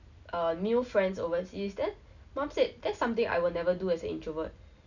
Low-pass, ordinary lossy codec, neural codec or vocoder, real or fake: 7.2 kHz; none; none; real